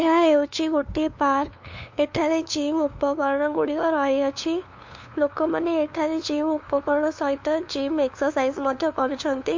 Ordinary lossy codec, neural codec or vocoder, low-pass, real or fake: MP3, 48 kbps; codec, 16 kHz, 2 kbps, FunCodec, trained on LibriTTS, 25 frames a second; 7.2 kHz; fake